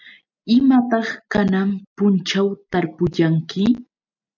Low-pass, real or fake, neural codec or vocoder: 7.2 kHz; real; none